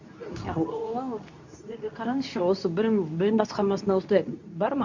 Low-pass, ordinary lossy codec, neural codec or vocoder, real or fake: 7.2 kHz; none; codec, 24 kHz, 0.9 kbps, WavTokenizer, medium speech release version 2; fake